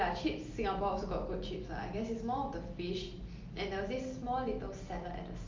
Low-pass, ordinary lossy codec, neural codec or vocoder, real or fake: 7.2 kHz; Opus, 24 kbps; none; real